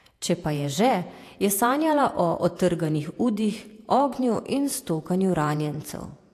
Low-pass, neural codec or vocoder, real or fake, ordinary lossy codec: 14.4 kHz; vocoder, 48 kHz, 128 mel bands, Vocos; fake; AAC, 64 kbps